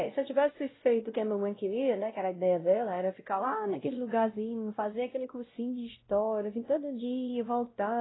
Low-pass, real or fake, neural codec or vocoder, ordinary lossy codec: 7.2 kHz; fake; codec, 16 kHz, 0.5 kbps, X-Codec, WavLM features, trained on Multilingual LibriSpeech; AAC, 16 kbps